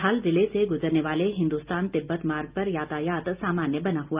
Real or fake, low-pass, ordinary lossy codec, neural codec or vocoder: real; 3.6 kHz; Opus, 32 kbps; none